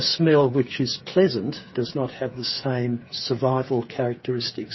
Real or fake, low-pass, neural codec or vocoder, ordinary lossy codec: fake; 7.2 kHz; codec, 16 kHz, 8 kbps, FreqCodec, smaller model; MP3, 24 kbps